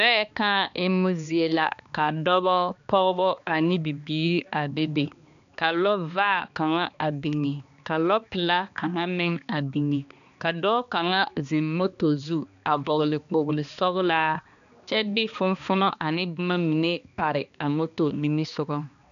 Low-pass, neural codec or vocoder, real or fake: 7.2 kHz; codec, 16 kHz, 2 kbps, X-Codec, HuBERT features, trained on balanced general audio; fake